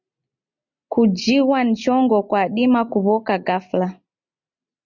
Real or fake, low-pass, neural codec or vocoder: real; 7.2 kHz; none